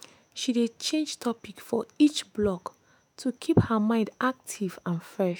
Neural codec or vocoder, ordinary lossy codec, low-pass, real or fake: autoencoder, 48 kHz, 128 numbers a frame, DAC-VAE, trained on Japanese speech; none; none; fake